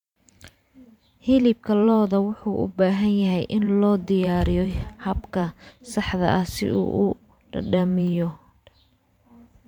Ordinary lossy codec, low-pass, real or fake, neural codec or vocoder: MP3, 96 kbps; 19.8 kHz; fake; vocoder, 44.1 kHz, 128 mel bands every 512 samples, BigVGAN v2